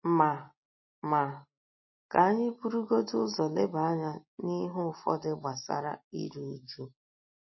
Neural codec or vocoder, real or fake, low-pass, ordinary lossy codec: none; real; 7.2 kHz; MP3, 24 kbps